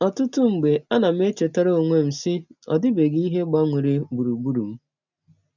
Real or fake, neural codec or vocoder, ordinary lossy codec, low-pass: real; none; none; 7.2 kHz